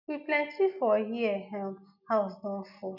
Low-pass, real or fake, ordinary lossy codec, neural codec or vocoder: 5.4 kHz; real; none; none